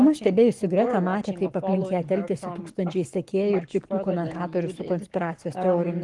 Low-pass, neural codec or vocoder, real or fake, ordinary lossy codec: 10.8 kHz; codec, 44.1 kHz, 7.8 kbps, Pupu-Codec; fake; Opus, 24 kbps